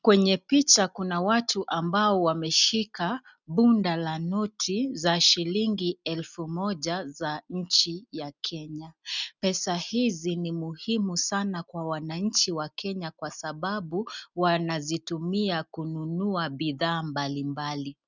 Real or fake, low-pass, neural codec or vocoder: real; 7.2 kHz; none